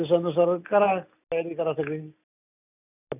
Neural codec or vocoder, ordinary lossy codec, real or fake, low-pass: none; none; real; 3.6 kHz